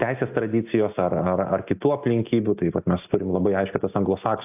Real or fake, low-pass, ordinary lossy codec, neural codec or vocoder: real; 3.6 kHz; AAC, 32 kbps; none